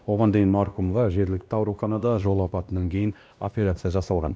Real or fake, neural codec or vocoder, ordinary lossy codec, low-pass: fake; codec, 16 kHz, 1 kbps, X-Codec, WavLM features, trained on Multilingual LibriSpeech; none; none